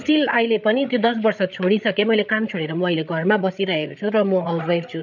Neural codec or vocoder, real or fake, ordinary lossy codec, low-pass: codec, 16 kHz, 8 kbps, FreqCodec, larger model; fake; none; 7.2 kHz